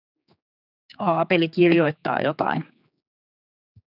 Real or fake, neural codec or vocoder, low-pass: fake; codec, 16 kHz, 4 kbps, X-Codec, HuBERT features, trained on general audio; 5.4 kHz